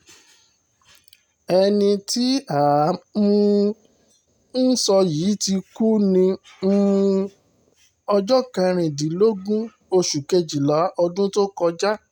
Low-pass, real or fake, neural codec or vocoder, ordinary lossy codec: 19.8 kHz; real; none; none